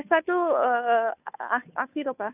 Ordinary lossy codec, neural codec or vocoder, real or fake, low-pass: none; codec, 16 kHz, 2 kbps, FunCodec, trained on Chinese and English, 25 frames a second; fake; 3.6 kHz